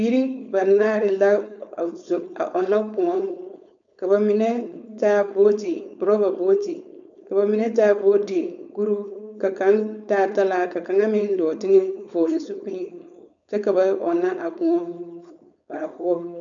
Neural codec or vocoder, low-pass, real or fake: codec, 16 kHz, 4.8 kbps, FACodec; 7.2 kHz; fake